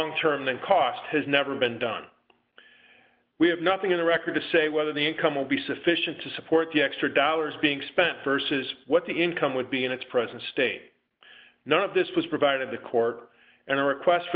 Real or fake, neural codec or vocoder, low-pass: real; none; 5.4 kHz